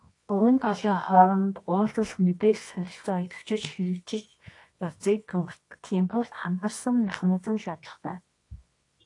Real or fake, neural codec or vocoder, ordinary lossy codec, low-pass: fake; codec, 24 kHz, 0.9 kbps, WavTokenizer, medium music audio release; AAC, 48 kbps; 10.8 kHz